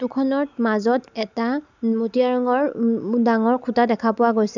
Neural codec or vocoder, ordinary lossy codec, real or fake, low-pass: none; none; real; 7.2 kHz